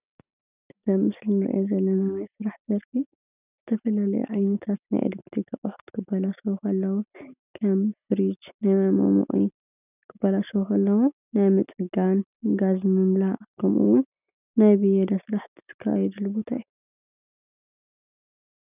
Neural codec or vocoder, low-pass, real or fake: none; 3.6 kHz; real